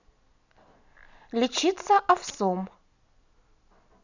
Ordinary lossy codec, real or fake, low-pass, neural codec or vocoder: none; real; 7.2 kHz; none